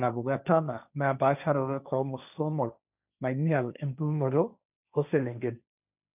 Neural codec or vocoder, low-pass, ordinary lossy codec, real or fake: codec, 16 kHz, 1.1 kbps, Voila-Tokenizer; 3.6 kHz; none; fake